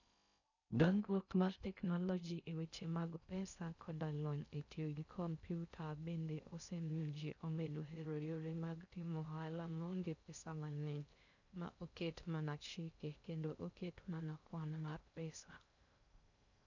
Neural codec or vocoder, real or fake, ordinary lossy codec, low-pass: codec, 16 kHz in and 24 kHz out, 0.6 kbps, FocalCodec, streaming, 4096 codes; fake; none; 7.2 kHz